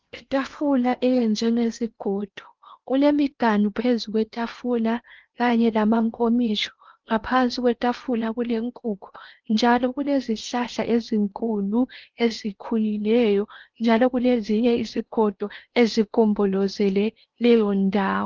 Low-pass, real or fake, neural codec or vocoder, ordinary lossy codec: 7.2 kHz; fake; codec, 16 kHz in and 24 kHz out, 0.8 kbps, FocalCodec, streaming, 65536 codes; Opus, 32 kbps